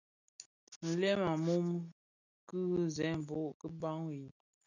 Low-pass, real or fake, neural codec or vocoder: 7.2 kHz; real; none